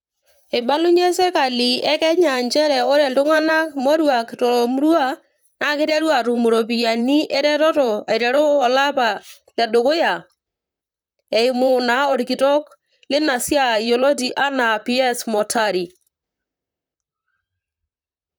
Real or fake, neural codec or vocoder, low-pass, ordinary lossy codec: fake; vocoder, 44.1 kHz, 128 mel bands, Pupu-Vocoder; none; none